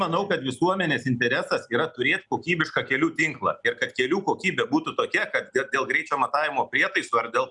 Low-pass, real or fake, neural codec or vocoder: 10.8 kHz; real; none